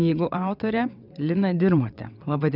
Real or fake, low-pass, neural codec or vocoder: fake; 5.4 kHz; vocoder, 22.05 kHz, 80 mel bands, WaveNeXt